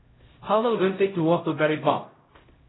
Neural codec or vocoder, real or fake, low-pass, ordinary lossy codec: codec, 16 kHz, 0.5 kbps, X-Codec, HuBERT features, trained on LibriSpeech; fake; 7.2 kHz; AAC, 16 kbps